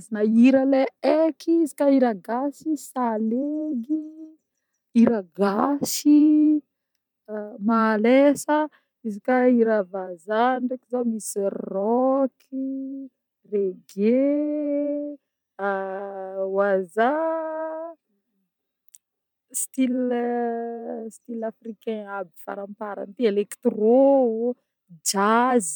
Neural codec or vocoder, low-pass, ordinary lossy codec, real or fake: vocoder, 44.1 kHz, 128 mel bands every 512 samples, BigVGAN v2; 19.8 kHz; none; fake